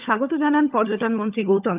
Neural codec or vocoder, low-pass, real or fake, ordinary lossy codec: codec, 16 kHz, 16 kbps, FunCodec, trained on LibriTTS, 50 frames a second; 3.6 kHz; fake; Opus, 32 kbps